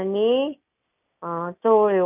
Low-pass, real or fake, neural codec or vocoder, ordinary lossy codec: 3.6 kHz; real; none; none